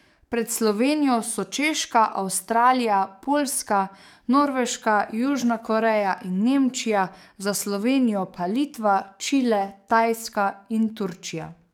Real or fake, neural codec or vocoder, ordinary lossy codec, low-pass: fake; codec, 44.1 kHz, 7.8 kbps, DAC; none; 19.8 kHz